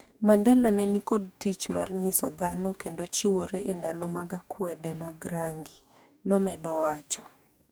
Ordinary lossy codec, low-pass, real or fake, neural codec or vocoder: none; none; fake; codec, 44.1 kHz, 2.6 kbps, DAC